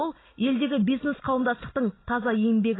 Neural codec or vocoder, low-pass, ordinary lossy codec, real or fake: none; 7.2 kHz; AAC, 16 kbps; real